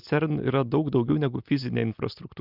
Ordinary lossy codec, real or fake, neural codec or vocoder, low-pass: Opus, 32 kbps; real; none; 5.4 kHz